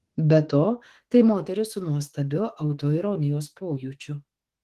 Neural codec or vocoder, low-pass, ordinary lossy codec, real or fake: autoencoder, 48 kHz, 32 numbers a frame, DAC-VAE, trained on Japanese speech; 14.4 kHz; Opus, 16 kbps; fake